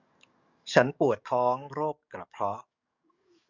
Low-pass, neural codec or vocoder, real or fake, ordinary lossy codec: 7.2 kHz; codec, 44.1 kHz, 7.8 kbps, DAC; fake; none